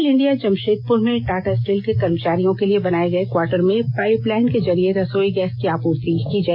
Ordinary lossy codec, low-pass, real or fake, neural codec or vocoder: MP3, 48 kbps; 5.4 kHz; real; none